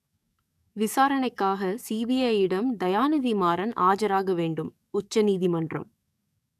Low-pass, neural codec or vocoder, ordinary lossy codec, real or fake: 14.4 kHz; codec, 44.1 kHz, 7.8 kbps, DAC; none; fake